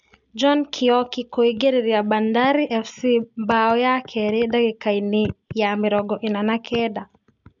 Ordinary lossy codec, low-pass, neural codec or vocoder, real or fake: none; 7.2 kHz; none; real